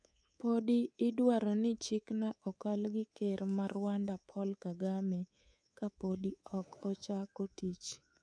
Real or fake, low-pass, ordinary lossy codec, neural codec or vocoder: fake; 9.9 kHz; none; codec, 24 kHz, 3.1 kbps, DualCodec